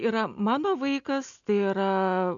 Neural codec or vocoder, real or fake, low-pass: none; real; 7.2 kHz